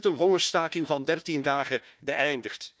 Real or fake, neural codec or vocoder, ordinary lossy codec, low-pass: fake; codec, 16 kHz, 1 kbps, FunCodec, trained on Chinese and English, 50 frames a second; none; none